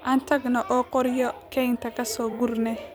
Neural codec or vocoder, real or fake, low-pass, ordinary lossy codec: vocoder, 44.1 kHz, 128 mel bands every 256 samples, BigVGAN v2; fake; none; none